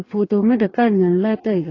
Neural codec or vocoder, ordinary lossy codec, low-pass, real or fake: codec, 44.1 kHz, 2.6 kbps, DAC; AAC, 32 kbps; 7.2 kHz; fake